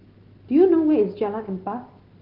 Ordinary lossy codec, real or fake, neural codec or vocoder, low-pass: Opus, 16 kbps; real; none; 5.4 kHz